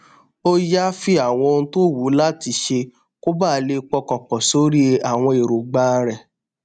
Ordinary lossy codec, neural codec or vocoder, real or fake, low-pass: none; none; real; 9.9 kHz